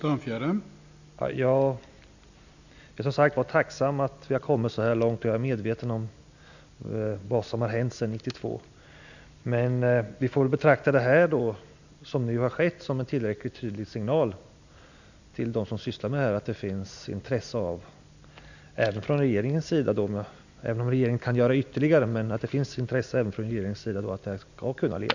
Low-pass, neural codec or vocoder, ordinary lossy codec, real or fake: 7.2 kHz; none; none; real